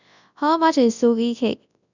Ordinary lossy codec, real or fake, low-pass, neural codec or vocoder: none; fake; 7.2 kHz; codec, 24 kHz, 0.9 kbps, WavTokenizer, large speech release